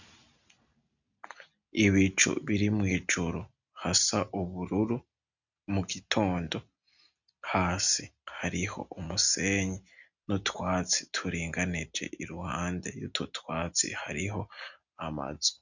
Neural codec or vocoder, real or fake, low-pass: none; real; 7.2 kHz